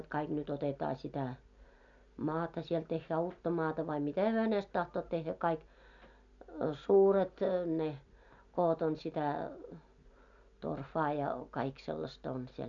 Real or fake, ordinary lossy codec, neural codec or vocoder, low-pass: real; none; none; 7.2 kHz